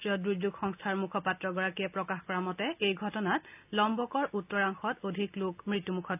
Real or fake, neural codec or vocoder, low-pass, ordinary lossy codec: real; none; 3.6 kHz; AAC, 32 kbps